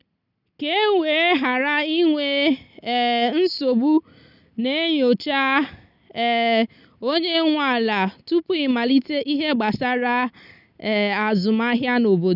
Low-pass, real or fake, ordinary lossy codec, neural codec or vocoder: 5.4 kHz; real; none; none